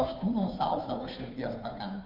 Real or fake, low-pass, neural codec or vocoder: fake; 5.4 kHz; codec, 16 kHz, 2 kbps, FunCodec, trained on Chinese and English, 25 frames a second